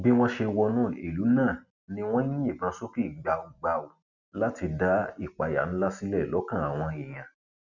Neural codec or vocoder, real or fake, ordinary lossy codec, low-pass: none; real; none; 7.2 kHz